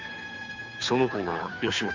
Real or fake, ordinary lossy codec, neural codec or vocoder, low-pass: fake; MP3, 64 kbps; codec, 16 kHz, 2 kbps, FunCodec, trained on Chinese and English, 25 frames a second; 7.2 kHz